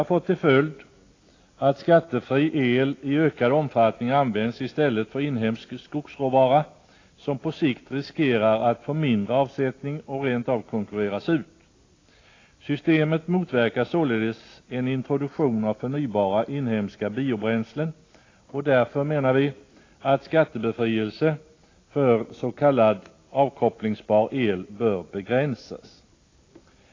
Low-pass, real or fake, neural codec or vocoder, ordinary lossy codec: 7.2 kHz; real; none; AAC, 32 kbps